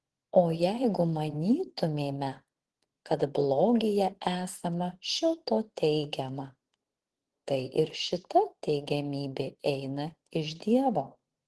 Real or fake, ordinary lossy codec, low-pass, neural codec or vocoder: fake; Opus, 16 kbps; 10.8 kHz; vocoder, 24 kHz, 100 mel bands, Vocos